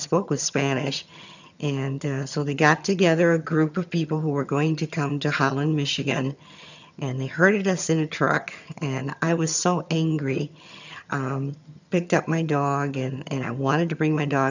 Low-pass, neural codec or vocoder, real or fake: 7.2 kHz; vocoder, 22.05 kHz, 80 mel bands, HiFi-GAN; fake